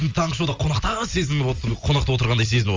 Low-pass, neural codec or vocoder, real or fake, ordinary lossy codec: 7.2 kHz; none; real; Opus, 32 kbps